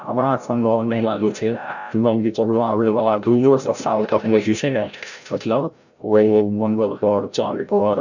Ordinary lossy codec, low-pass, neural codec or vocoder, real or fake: none; 7.2 kHz; codec, 16 kHz, 0.5 kbps, FreqCodec, larger model; fake